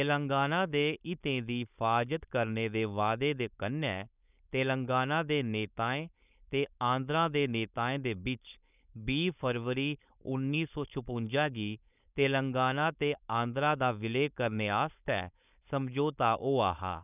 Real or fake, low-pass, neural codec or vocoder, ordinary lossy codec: fake; 3.6 kHz; codec, 16 kHz, 4.8 kbps, FACodec; none